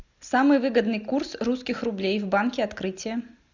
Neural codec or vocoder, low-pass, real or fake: none; 7.2 kHz; real